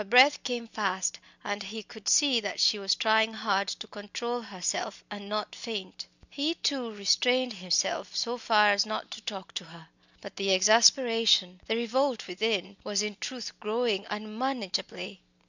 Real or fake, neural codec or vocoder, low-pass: real; none; 7.2 kHz